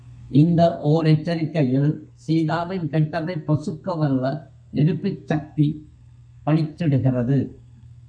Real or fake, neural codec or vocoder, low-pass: fake; codec, 32 kHz, 1.9 kbps, SNAC; 9.9 kHz